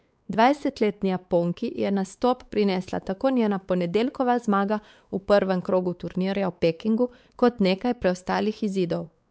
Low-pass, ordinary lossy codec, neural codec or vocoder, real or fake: none; none; codec, 16 kHz, 4 kbps, X-Codec, WavLM features, trained on Multilingual LibriSpeech; fake